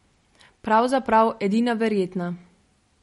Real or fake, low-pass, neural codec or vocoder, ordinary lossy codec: real; 19.8 kHz; none; MP3, 48 kbps